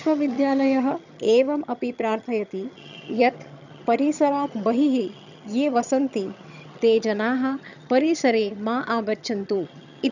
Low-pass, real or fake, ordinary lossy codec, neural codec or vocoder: 7.2 kHz; fake; none; vocoder, 22.05 kHz, 80 mel bands, HiFi-GAN